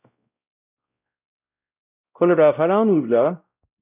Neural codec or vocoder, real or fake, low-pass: codec, 16 kHz, 0.5 kbps, X-Codec, WavLM features, trained on Multilingual LibriSpeech; fake; 3.6 kHz